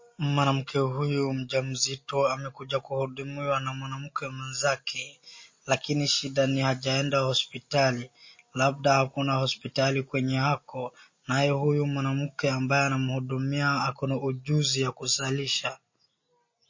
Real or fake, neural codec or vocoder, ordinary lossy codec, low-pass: real; none; MP3, 32 kbps; 7.2 kHz